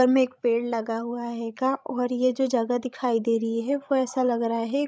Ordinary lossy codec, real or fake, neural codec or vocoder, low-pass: none; fake; codec, 16 kHz, 16 kbps, FreqCodec, larger model; none